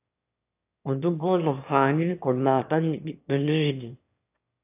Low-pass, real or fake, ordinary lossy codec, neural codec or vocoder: 3.6 kHz; fake; AAC, 32 kbps; autoencoder, 22.05 kHz, a latent of 192 numbers a frame, VITS, trained on one speaker